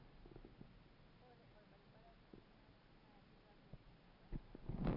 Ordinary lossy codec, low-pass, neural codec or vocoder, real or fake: none; 5.4 kHz; none; real